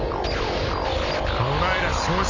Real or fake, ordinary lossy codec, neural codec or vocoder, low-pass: real; none; none; 7.2 kHz